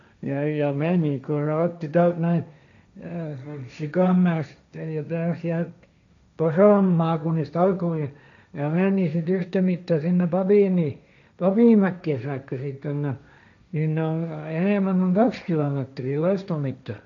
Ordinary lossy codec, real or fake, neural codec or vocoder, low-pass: none; fake; codec, 16 kHz, 1.1 kbps, Voila-Tokenizer; 7.2 kHz